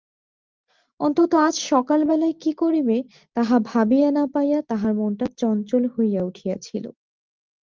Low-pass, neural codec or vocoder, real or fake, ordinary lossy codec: 7.2 kHz; none; real; Opus, 32 kbps